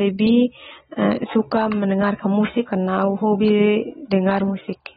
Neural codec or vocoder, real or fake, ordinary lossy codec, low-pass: none; real; AAC, 16 kbps; 9.9 kHz